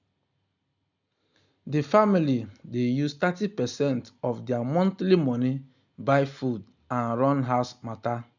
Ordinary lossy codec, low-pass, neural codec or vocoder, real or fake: none; 7.2 kHz; none; real